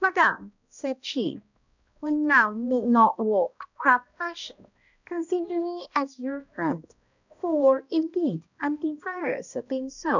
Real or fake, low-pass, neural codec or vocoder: fake; 7.2 kHz; codec, 16 kHz, 1 kbps, X-Codec, HuBERT features, trained on balanced general audio